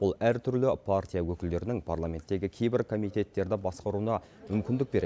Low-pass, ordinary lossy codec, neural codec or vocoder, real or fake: none; none; none; real